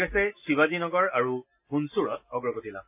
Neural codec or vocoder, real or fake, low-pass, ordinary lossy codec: none; real; 3.6 kHz; AAC, 24 kbps